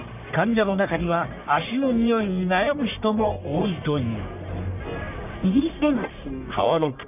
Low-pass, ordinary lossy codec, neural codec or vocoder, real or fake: 3.6 kHz; none; codec, 44.1 kHz, 1.7 kbps, Pupu-Codec; fake